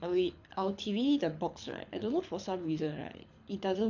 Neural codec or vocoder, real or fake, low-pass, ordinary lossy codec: codec, 24 kHz, 6 kbps, HILCodec; fake; 7.2 kHz; none